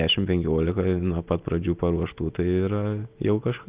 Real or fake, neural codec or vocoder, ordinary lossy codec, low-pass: real; none; Opus, 32 kbps; 3.6 kHz